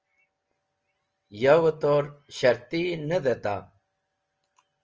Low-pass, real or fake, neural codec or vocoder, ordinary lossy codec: 7.2 kHz; real; none; Opus, 24 kbps